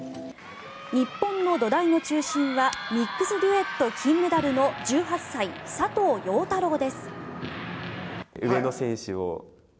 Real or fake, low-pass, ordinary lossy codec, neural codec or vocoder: real; none; none; none